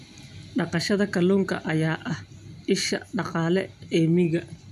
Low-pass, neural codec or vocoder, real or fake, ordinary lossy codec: 14.4 kHz; none; real; none